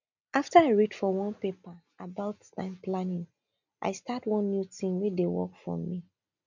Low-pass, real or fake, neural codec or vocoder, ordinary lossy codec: 7.2 kHz; real; none; none